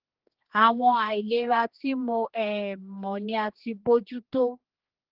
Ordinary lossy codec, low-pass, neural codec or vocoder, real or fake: Opus, 16 kbps; 5.4 kHz; codec, 16 kHz, 2 kbps, X-Codec, HuBERT features, trained on general audio; fake